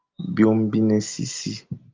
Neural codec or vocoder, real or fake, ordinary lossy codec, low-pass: none; real; Opus, 32 kbps; 7.2 kHz